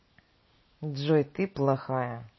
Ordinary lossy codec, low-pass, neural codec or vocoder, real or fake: MP3, 24 kbps; 7.2 kHz; none; real